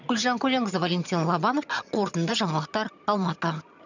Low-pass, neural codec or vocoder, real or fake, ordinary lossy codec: 7.2 kHz; vocoder, 22.05 kHz, 80 mel bands, HiFi-GAN; fake; none